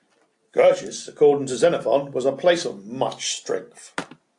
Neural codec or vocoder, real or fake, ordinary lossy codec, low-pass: none; real; AAC, 64 kbps; 10.8 kHz